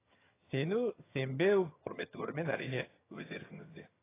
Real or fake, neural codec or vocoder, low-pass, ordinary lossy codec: fake; vocoder, 22.05 kHz, 80 mel bands, HiFi-GAN; 3.6 kHz; AAC, 16 kbps